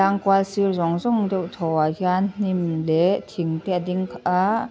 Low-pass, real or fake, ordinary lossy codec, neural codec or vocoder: none; real; none; none